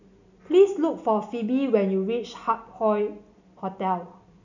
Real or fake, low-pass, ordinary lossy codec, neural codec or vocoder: real; 7.2 kHz; none; none